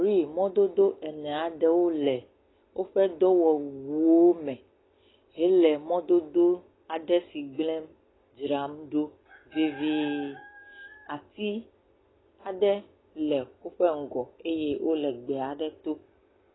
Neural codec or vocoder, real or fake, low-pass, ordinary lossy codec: none; real; 7.2 kHz; AAC, 16 kbps